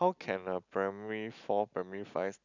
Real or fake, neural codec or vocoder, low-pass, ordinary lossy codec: real; none; 7.2 kHz; none